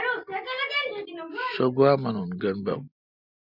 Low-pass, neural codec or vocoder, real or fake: 5.4 kHz; none; real